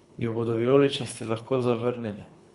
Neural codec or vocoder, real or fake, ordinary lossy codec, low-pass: codec, 24 kHz, 3 kbps, HILCodec; fake; Opus, 64 kbps; 10.8 kHz